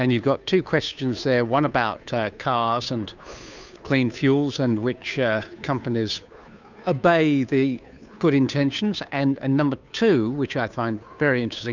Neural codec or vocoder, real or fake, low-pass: codec, 16 kHz, 2 kbps, FunCodec, trained on Chinese and English, 25 frames a second; fake; 7.2 kHz